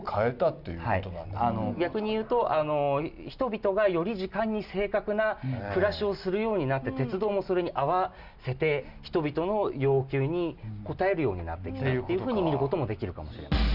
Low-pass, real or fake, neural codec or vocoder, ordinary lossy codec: 5.4 kHz; real; none; Opus, 64 kbps